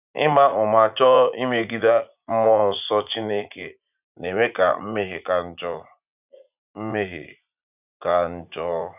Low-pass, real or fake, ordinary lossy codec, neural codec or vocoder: 3.6 kHz; fake; none; vocoder, 44.1 kHz, 80 mel bands, Vocos